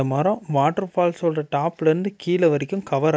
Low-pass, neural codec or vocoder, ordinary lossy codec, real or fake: none; none; none; real